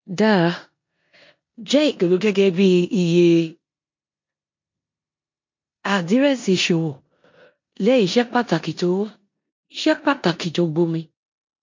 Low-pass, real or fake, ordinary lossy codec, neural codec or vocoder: 7.2 kHz; fake; MP3, 48 kbps; codec, 16 kHz in and 24 kHz out, 0.9 kbps, LongCat-Audio-Codec, four codebook decoder